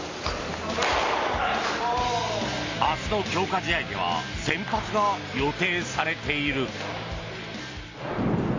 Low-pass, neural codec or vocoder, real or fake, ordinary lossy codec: 7.2 kHz; none; real; AAC, 32 kbps